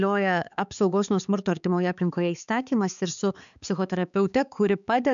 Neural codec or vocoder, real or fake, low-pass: codec, 16 kHz, 4 kbps, X-Codec, HuBERT features, trained on balanced general audio; fake; 7.2 kHz